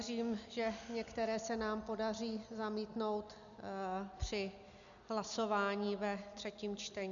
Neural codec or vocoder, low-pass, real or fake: none; 7.2 kHz; real